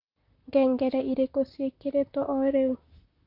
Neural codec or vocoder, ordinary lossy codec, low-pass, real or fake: vocoder, 44.1 kHz, 128 mel bands, Pupu-Vocoder; AAC, 48 kbps; 5.4 kHz; fake